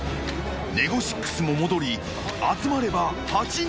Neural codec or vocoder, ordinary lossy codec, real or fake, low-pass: none; none; real; none